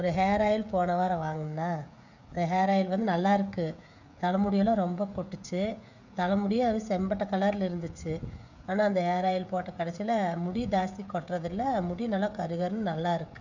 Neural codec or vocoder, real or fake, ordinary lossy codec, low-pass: codec, 16 kHz, 16 kbps, FreqCodec, smaller model; fake; none; 7.2 kHz